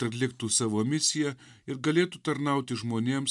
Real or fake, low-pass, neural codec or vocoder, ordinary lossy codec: real; 10.8 kHz; none; MP3, 96 kbps